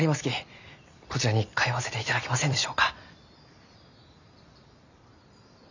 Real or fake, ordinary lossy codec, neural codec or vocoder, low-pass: fake; none; vocoder, 44.1 kHz, 128 mel bands every 256 samples, BigVGAN v2; 7.2 kHz